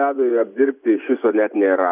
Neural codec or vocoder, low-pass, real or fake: vocoder, 24 kHz, 100 mel bands, Vocos; 3.6 kHz; fake